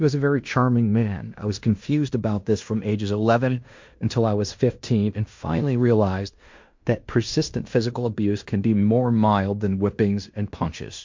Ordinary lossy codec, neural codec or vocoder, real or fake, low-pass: MP3, 48 kbps; codec, 16 kHz in and 24 kHz out, 0.9 kbps, LongCat-Audio-Codec, fine tuned four codebook decoder; fake; 7.2 kHz